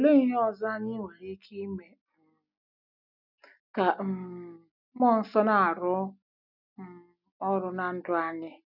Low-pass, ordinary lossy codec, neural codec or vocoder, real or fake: 5.4 kHz; none; none; real